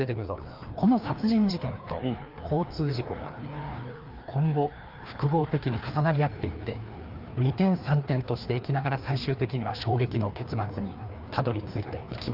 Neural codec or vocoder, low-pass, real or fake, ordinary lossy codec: codec, 16 kHz, 2 kbps, FreqCodec, larger model; 5.4 kHz; fake; Opus, 24 kbps